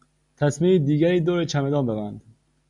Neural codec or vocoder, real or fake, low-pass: none; real; 10.8 kHz